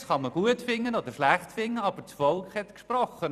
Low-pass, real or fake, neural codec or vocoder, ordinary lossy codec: 14.4 kHz; fake; vocoder, 44.1 kHz, 128 mel bands every 256 samples, BigVGAN v2; none